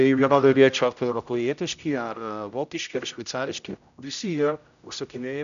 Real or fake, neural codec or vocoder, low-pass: fake; codec, 16 kHz, 0.5 kbps, X-Codec, HuBERT features, trained on general audio; 7.2 kHz